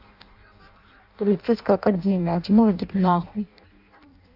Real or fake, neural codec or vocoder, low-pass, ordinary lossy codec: fake; codec, 16 kHz in and 24 kHz out, 0.6 kbps, FireRedTTS-2 codec; 5.4 kHz; AAC, 48 kbps